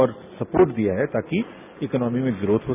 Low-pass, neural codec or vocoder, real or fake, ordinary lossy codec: 3.6 kHz; none; real; none